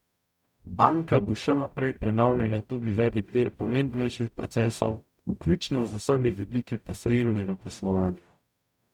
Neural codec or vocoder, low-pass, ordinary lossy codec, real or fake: codec, 44.1 kHz, 0.9 kbps, DAC; 19.8 kHz; none; fake